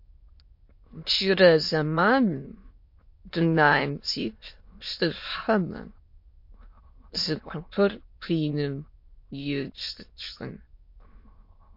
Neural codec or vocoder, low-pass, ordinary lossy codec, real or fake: autoencoder, 22.05 kHz, a latent of 192 numbers a frame, VITS, trained on many speakers; 5.4 kHz; MP3, 32 kbps; fake